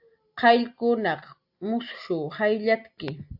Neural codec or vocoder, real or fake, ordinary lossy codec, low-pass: none; real; AAC, 48 kbps; 5.4 kHz